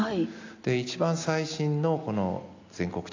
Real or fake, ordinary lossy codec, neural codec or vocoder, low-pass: real; AAC, 48 kbps; none; 7.2 kHz